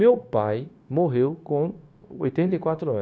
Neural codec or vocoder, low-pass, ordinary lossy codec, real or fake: codec, 16 kHz, 0.9 kbps, LongCat-Audio-Codec; none; none; fake